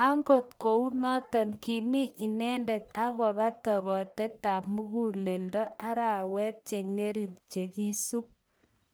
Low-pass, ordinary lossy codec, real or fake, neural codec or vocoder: none; none; fake; codec, 44.1 kHz, 1.7 kbps, Pupu-Codec